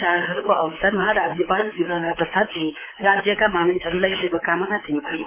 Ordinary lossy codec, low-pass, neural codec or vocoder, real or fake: MP3, 24 kbps; 3.6 kHz; codec, 24 kHz, 3.1 kbps, DualCodec; fake